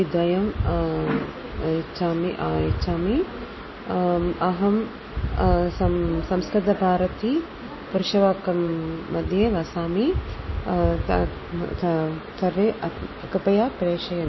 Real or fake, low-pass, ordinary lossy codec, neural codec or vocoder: real; 7.2 kHz; MP3, 24 kbps; none